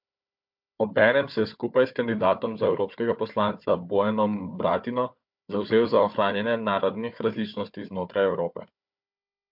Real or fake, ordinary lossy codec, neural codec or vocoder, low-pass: fake; MP3, 48 kbps; codec, 16 kHz, 4 kbps, FunCodec, trained on Chinese and English, 50 frames a second; 5.4 kHz